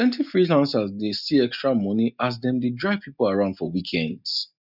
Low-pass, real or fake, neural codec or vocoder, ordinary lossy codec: 5.4 kHz; real; none; none